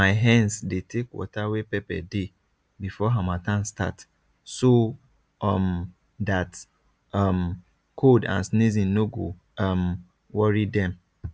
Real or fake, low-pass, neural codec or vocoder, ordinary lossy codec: real; none; none; none